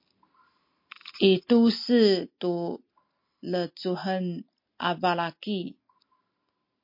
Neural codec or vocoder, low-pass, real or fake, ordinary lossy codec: none; 5.4 kHz; real; MP3, 32 kbps